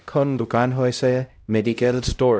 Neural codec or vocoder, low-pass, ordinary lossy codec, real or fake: codec, 16 kHz, 0.5 kbps, X-Codec, HuBERT features, trained on LibriSpeech; none; none; fake